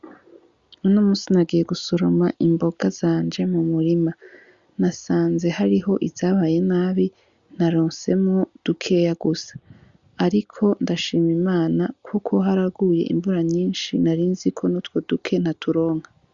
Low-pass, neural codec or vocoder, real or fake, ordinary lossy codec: 7.2 kHz; none; real; Opus, 64 kbps